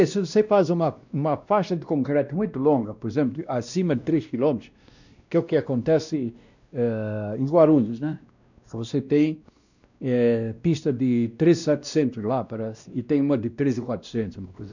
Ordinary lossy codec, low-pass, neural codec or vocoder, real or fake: none; 7.2 kHz; codec, 16 kHz, 1 kbps, X-Codec, WavLM features, trained on Multilingual LibriSpeech; fake